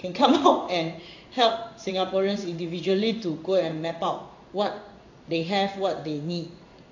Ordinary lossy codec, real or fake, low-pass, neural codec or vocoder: AAC, 48 kbps; fake; 7.2 kHz; codec, 16 kHz in and 24 kHz out, 1 kbps, XY-Tokenizer